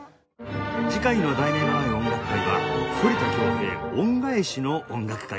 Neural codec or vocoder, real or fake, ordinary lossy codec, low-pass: none; real; none; none